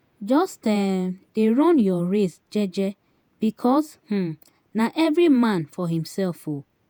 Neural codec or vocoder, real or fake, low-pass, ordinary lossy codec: vocoder, 48 kHz, 128 mel bands, Vocos; fake; none; none